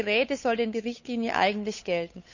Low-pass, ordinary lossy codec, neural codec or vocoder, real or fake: 7.2 kHz; none; codec, 16 kHz, 8 kbps, FunCodec, trained on Chinese and English, 25 frames a second; fake